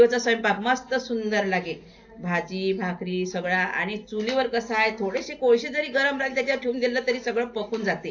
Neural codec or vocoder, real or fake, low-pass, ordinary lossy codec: none; real; 7.2 kHz; AAC, 48 kbps